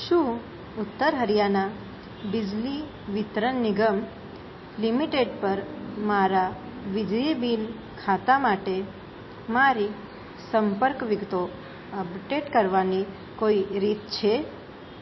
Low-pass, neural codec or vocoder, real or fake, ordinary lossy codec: 7.2 kHz; none; real; MP3, 24 kbps